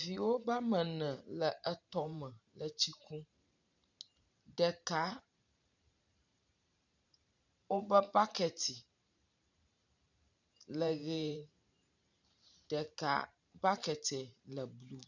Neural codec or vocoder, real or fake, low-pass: vocoder, 44.1 kHz, 128 mel bands every 512 samples, BigVGAN v2; fake; 7.2 kHz